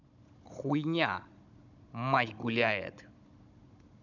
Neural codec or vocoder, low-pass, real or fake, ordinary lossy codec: codec, 16 kHz, 16 kbps, FunCodec, trained on Chinese and English, 50 frames a second; 7.2 kHz; fake; none